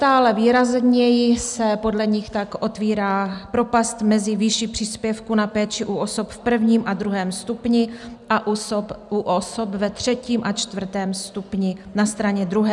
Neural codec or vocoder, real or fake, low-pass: none; real; 10.8 kHz